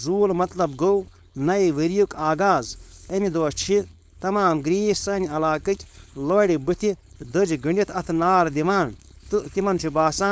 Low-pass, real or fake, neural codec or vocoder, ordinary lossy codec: none; fake; codec, 16 kHz, 4.8 kbps, FACodec; none